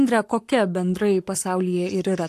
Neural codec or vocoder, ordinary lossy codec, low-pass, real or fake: codec, 44.1 kHz, 7.8 kbps, Pupu-Codec; AAC, 64 kbps; 14.4 kHz; fake